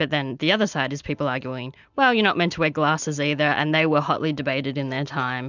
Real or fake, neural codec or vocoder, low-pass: real; none; 7.2 kHz